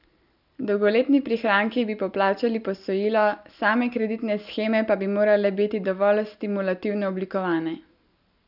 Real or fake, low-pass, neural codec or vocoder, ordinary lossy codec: real; 5.4 kHz; none; none